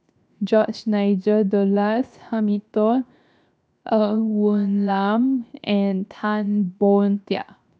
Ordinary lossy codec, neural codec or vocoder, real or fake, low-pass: none; codec, 16 kHz, 0.7 kbps, FocalCodec; fake; none